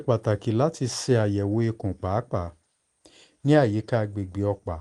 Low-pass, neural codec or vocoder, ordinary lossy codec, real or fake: 10.8 kHz; none; Opus, 24 kbps; real